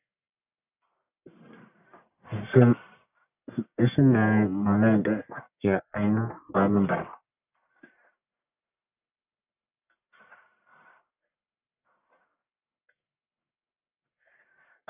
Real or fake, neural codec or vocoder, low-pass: fake; codec, 44.1 kHz, 1.7 kbps, Pupu-Codec; 3.6 kHz